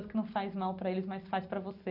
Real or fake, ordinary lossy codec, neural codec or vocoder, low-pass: real; AAC, 48 kbps; none; 5.4 kHz